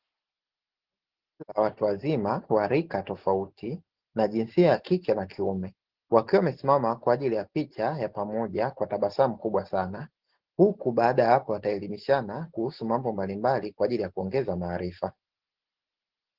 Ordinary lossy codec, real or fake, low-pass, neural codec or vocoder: Opus, 16 kbps; real; 5.4 kHz; none